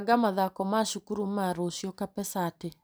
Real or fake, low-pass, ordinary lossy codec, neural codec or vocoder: real; none; none; none